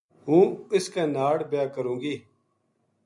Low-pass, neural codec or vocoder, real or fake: 10.8 kHz; none; real